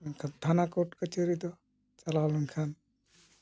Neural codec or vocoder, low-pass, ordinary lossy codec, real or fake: none; none; none; real